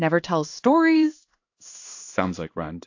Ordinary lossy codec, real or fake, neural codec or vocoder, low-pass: AAC, 48 kbps; fake; codec, 16 kHz in and 24 kHz out, 1 kbps, XY-Tokenizer; 7.2 kHz